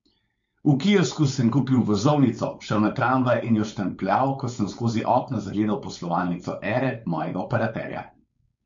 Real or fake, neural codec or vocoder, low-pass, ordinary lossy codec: fake; codec, 16 kHz, 4.8 kbps, FACodec; 7.2 kHz; MP3, 48 kbps